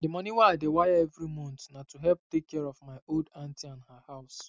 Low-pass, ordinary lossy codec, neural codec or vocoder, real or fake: none; none; none; real